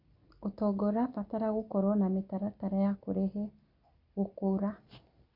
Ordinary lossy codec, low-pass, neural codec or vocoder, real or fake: Opus, 64 kbps; 5.4 kHz; none; real